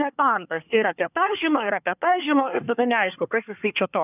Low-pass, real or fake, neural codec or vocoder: 3.6 kHz; fake; codec, 24 kHz, 1 kbps, SNAC